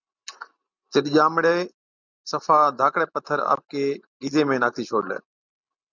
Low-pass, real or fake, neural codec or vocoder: 7.2 kHz; real; none